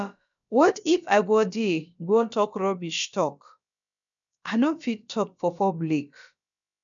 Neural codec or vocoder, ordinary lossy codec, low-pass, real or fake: codec, 16 kHz, about 1 kbps, DyCAST, with the encoder's durations; none; 7.2 kHz; fake